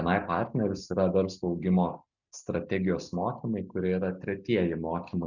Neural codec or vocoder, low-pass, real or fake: none; 7.2 kHz; real